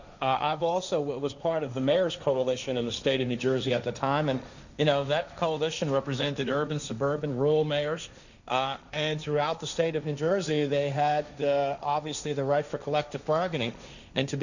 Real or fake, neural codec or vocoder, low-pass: fake; codec, 16 kHz, 1.1 kbps, Voila-Tokenizer; 7.2 kHz